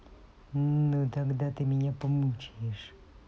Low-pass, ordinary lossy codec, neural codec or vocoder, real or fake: none; none; none; real